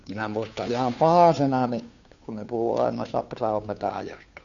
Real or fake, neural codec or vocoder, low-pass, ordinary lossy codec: fake; codec, 16 kHz, 2 kbps, FunCodec, trained on Chinese and English, 25 frames a second; 7.2 kHz; none